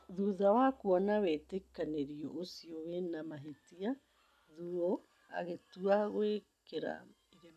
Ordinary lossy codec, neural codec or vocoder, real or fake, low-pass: none; none; real; 14.4 kHz